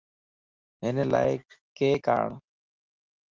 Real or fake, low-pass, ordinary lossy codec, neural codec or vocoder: real; 7.2 kHz; Opus, 32 kbps; none